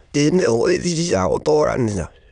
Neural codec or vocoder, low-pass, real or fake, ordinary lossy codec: autoencoder, 22.05 kHz, a latent of 192 numbers a frame, VITS, trained on many speakers; 9.9 kHz; fake; none